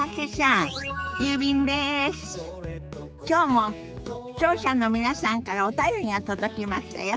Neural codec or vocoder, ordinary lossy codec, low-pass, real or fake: codec, 16 kHz, 4 kbps, X-Codec, HuBERT features, trained on general audio; none; none; fake